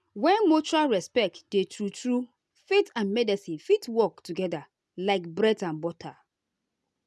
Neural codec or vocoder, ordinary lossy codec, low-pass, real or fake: none; none; none; real